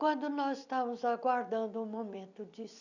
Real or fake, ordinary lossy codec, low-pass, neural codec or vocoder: real; none; 7.2 kHz; none